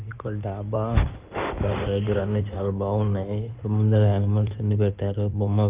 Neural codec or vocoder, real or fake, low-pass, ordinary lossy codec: codec, 16 kHz in and 24 kHz out, 2.2 kbps, FireRedTTS-2 codec; fake; 3.6 kHz; Opus, 32 kbps